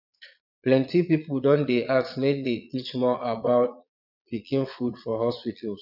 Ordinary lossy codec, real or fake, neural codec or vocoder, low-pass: none; fake; vocoder, 22.05 kHz, 80 mel bands, Vocos; 5.4 kHz